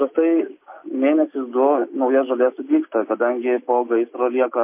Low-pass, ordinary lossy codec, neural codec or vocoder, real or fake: 3.6 kHz; MP3, 24 kbps; none; real